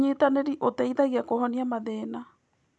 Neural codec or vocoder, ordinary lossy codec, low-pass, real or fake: none; none; none; real